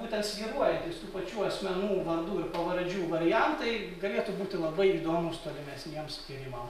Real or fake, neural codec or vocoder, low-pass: real; none; 14.4 kHz